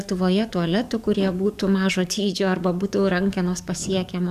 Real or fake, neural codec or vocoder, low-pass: fake; codec, 44.1 kHz, 7.8 kbps, DAC; 14.4 kHz